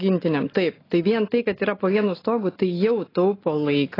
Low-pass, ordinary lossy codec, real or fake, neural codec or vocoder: 5.4 kHz; AAC, 24 kbps; real; none